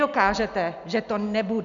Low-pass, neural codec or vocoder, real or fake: 7.2 kHz; none; real